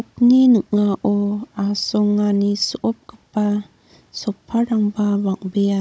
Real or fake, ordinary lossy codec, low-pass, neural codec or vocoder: fake; none; none; codec, 16 kHz, 16 kbps, FunCodec, trained on Chinese and English, 50 frames a second